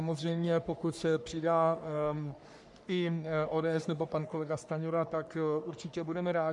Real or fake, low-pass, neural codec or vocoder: fake; 10.8 kHz; codec, 44.1 kHz, 3.4 kbps, Pupu-Codec